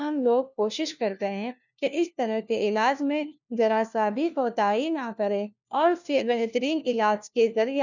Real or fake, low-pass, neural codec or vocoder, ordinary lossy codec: fake; 7.2 kHz; codec, 16 kHz, 1 kbps, FunCodec, trained on LibriTTS, 50 frames a second; none